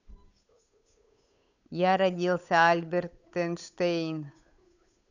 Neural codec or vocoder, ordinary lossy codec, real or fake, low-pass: codec, 16 kHz, 8 kbps, FunCodec, trained on Chinese and English, 25 frames a second; none; fake; 7.2 kHz